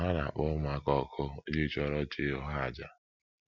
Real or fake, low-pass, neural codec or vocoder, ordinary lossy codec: real; 7.2 kHz; none; none